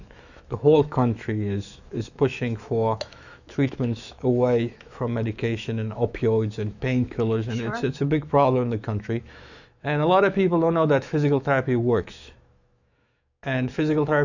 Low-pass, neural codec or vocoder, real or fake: 7.2 kHz; autoencoder, 48 kHz, 128 numbers a frame, DAC-VAE, trained on Japanese speech; fake